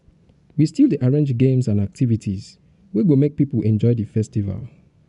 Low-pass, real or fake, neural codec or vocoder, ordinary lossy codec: 10.8 kHz; fake; vocoder, 24 kHz, 100 mel bands, Vocos; none